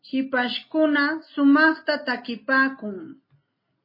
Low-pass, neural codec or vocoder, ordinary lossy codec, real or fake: 5.4 kHz; none; MP3, 24 kbps; real